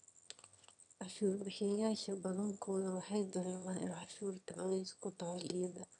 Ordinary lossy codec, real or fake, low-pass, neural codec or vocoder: none; fake; 9.9 kHz; autoencoder, 22.05 kHz, a latent of 192 numbers a frame, VITS, trained on one speaker